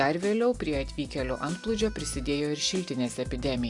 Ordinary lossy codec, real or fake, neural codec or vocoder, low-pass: AAC, 64 kbps; real; none; 10.8 kHz